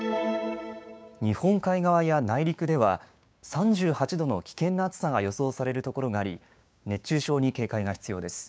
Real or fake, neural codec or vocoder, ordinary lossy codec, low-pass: fake; codec, 16 kHz, 6 kbps, DAC; none; none